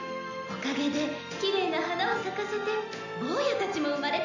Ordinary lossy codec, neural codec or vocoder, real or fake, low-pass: none; none; real; 7.2 kHz